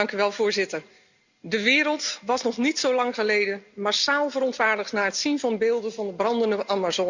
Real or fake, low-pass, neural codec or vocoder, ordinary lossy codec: real; 7.2 kHz; none; Opus, 64 kbps